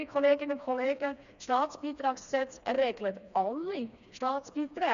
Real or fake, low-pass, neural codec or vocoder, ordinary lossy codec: fake; 7.2 kHz; codec, 16 kHz, 2 kbps, FreqCodec, smaller model; none